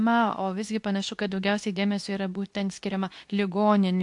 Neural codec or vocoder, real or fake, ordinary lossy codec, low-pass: codec, 24 kHz, 0.9 kbps, WavTokenizer, medium speech release version 2; fake; AAC, 64 kbps; 10.8 kHz